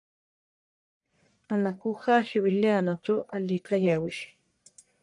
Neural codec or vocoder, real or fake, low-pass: codec, 44.1 kHz, 1.7 kbps, Pupu-Codec; fake; 10.8 kHz